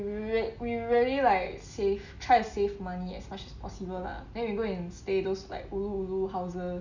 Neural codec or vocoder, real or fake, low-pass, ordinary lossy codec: none; real; 7.2 kHz; none